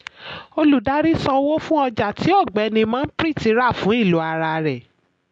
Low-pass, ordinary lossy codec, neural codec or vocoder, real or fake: 9.9 kHz; MP3, 64 kbps; none; real